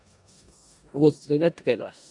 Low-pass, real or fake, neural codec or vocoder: 10.8 kHz; fake; codec, 16 kHz in and 24 kHz out, 0.4 kbps, LongCat-Audio-Codec, four codebook decoder